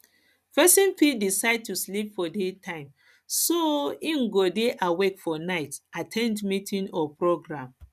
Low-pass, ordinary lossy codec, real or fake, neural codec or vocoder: 14.4 kHz; none; real; none